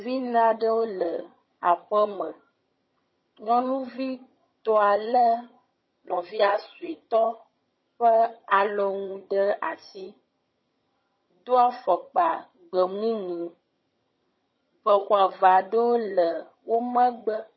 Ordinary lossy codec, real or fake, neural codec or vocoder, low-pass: MP3, 24 kbps; fake; vocoder, 22.05 kHz, 80 mel bands, HiFi-GAN; 7.2 kHz